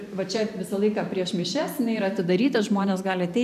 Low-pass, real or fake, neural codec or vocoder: 14.4 kHz; fake; vocoder, 44.1 kHz, 128 mel bands every 512 samples, BigVGAN v2